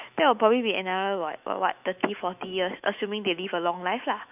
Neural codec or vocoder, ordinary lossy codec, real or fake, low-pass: autoencoder, 48 kHz, 128 numbers a frame, DAC-VAE, trained on Japanese speech; none; fake; 3.6 kHz